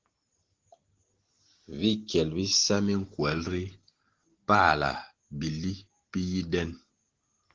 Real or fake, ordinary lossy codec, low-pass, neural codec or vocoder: real; Opus, 16 kbps; 7.2 kHz; none